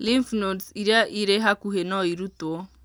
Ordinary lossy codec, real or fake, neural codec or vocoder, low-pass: none; real; none; none